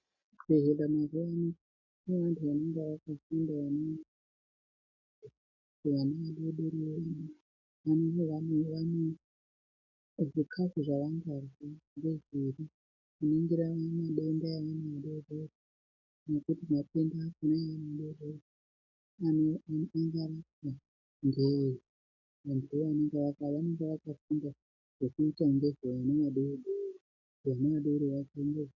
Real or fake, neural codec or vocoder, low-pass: real; none; 7.2 kHz